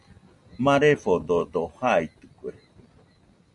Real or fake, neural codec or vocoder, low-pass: real; none; 10.8 kHz